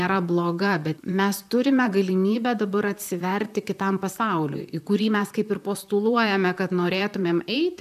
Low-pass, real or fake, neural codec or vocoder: 14.4 kHz; fake; vocoder, 44.1 kHz, 128 mel bands, Pupu-Vocoder